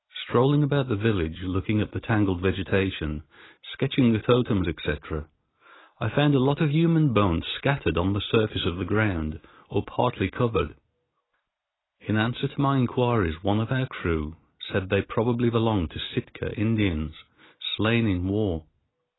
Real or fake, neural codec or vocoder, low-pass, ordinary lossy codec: real; none; 7.2 kHz; AAC, 16 kbps